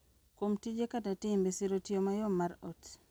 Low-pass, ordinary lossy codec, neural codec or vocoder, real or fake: none; none; none; real